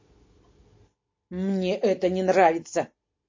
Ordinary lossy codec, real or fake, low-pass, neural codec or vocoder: MP3, 32 kbps; real; 7.2 kHz; none